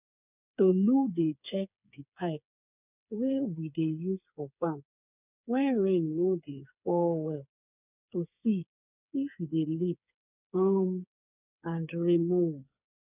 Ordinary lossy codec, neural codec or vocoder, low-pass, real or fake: none; codec, 16 kHz, 4 kbps, FreqCodec, smaller model; 3.6 kHz; fake